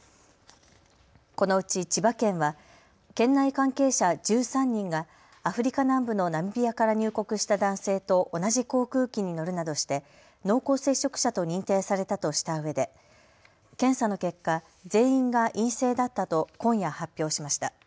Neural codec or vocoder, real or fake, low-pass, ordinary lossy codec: none; real; none; none